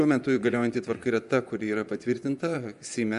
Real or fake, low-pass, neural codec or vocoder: fake; 10.8 kHz; vocoder, 24 kHz, 100 mel bands, Vocos